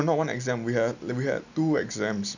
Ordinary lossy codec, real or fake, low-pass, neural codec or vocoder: none; real; 7.2 kHz; none